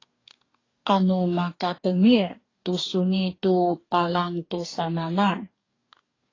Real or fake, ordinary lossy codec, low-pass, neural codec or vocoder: fake; AAC, 32 kbps; 7.2 kHz; codec, 44.1 kHz, 2.6 kbps, DAC